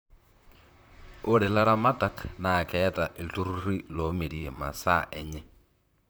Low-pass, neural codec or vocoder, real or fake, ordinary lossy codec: none; vocoder, 44.1 kHz, 128 mel bands, Pupu-Vocoder; fake; none